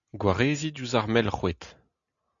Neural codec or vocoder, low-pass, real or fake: none; 7.2 kHz; real